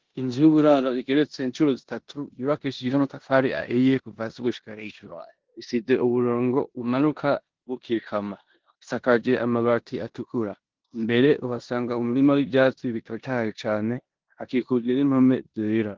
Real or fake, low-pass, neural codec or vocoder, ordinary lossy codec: fake; 7.2 kHz; codec, 16 kHz in and 24 kHz out, 0.9 kbps, LongCat-Audio-Codec, four codebook decoder; Opus, 16 kbps